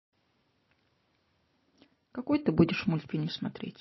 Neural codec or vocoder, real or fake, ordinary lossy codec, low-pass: none; real; MP3, 24 kbps; 7.2 kHz